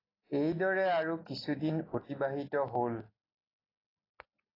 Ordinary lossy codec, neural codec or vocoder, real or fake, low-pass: AAC, 24 kbps; none; real; 5.4 kHz